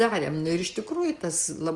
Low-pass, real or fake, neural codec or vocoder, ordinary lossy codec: 10.8 kHz; real; none; Opus, 24 kbps